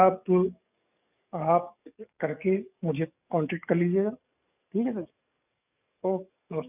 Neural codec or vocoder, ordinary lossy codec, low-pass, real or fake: codec, 44.1 kHz, 7.8 kbps, DAC; none; 3.6 kHz; fake